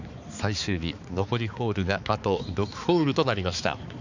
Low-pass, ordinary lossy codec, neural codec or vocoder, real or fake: 7.2 kHz; none; codec, 16 kHz, 4 kbps, X-Codec, HuBERT features, trained on balanced general audio; fake